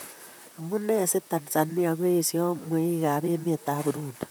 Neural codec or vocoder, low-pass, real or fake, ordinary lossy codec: vocoder, 44.1 kHz, 128 mel bands, Pupu-Vocoder; none; fake; none